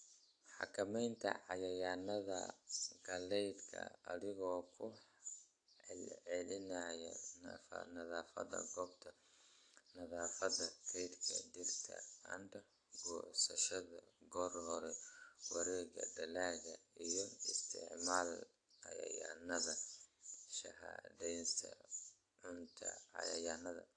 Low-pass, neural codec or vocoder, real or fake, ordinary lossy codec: 9.9 kHz; none; real; AAC, 48 kbps